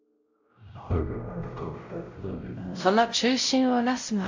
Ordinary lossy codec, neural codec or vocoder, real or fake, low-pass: AAC, 48 kbps; codec, 16 kHz, 0.5 kbps, X-Codec, WavLM features, trained on Multilingual LibriSpeech; fake; 7.2 kHz